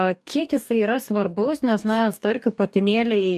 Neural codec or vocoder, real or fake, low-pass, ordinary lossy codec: codec, 44.1 kHz, 2.6 kbps, DAC; fake; 14.4 kHz; MP3, 96 kbps